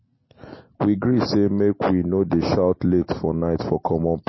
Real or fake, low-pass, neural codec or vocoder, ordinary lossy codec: real; 7.2 kHz; none; MP3, 24 kbps